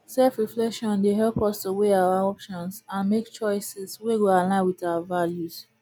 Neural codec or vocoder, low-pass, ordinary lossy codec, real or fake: none; none; none; real